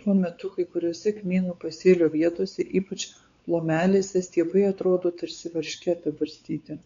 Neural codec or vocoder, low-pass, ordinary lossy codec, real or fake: codec, 16 kHz, 4 kbps, X-Codec, WavLM features, trained on Multilingual LibriSpeech; 7.2 kHz; MP3, 64 kbps; fake